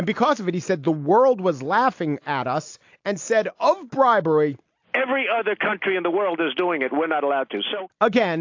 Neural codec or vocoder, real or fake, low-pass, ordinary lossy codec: none; real; 7.2 kHz; AAC, 48 kbps